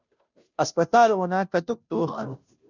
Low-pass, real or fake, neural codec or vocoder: 7.2 kHz; fake; codec, 16 kHz, 0.5 kbps, FunCodec, trained on Chinese and English, 25 frames a second